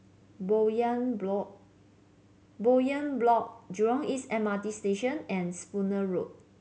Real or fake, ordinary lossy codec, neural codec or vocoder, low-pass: real; none; none; none